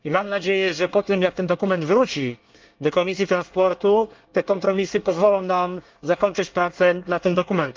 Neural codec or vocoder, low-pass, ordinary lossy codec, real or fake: codec, 24 kHz, 1 kbps, SNAC; 7.2 kHz; Opus, 32 kbps; fake